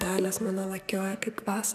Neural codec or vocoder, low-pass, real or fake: codec, 44.1 kHz, 2.6 kbps, SNAC; 14.4 kHz; fake